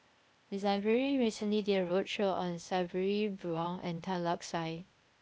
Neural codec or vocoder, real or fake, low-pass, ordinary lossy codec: codec, 16 kHz, 0.8 kbps, ZipCodec; fake; none; none